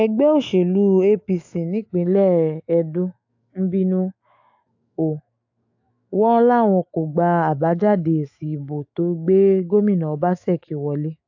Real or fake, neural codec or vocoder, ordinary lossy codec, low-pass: fake; autoencoder, 48 kHz, 128 numbers a frame, DAC-VAE, trained on Japanese speech; AAC, 48 kbps; 7.2 kHz